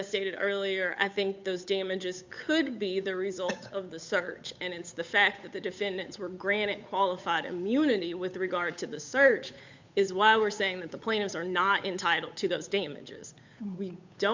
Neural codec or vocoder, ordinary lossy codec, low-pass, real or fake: codec, 16 kHz, 8 kbps, FunCodec, trained on Chinese and English, 25 frames a second; MP3, 64 kbps; 7.2 kHz; fake